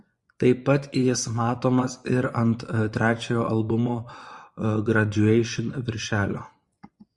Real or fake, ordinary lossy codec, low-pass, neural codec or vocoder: fake; Opus, 64 kbps; 10.8 kHz; vocoder, 24 kHz, 100 mel bands, Vocos